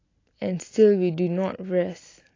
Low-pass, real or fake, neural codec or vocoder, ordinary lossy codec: 7.2 kHz; real; none; AAC, 32 kbps